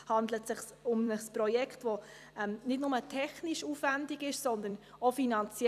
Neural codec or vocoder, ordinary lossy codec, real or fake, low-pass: vocoder, 44.1 kHz, 128 mel bands every 256 samples, BigVGAN v2; none; fake; 14.4 kHz